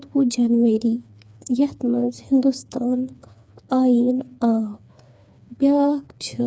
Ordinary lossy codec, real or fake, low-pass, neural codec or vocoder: none; fake; none; codec, 16 kHz, 4 kbps, FreqCodec, smaller model